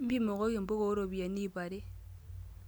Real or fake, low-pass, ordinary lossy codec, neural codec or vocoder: real; none; none; none